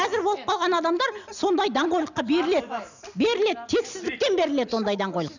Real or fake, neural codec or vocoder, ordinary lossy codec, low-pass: real; none; none; 7.2 kHz